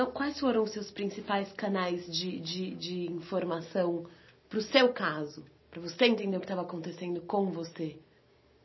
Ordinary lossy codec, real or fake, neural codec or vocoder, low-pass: MP3, 24 kbps; real; none; 7.2 kHz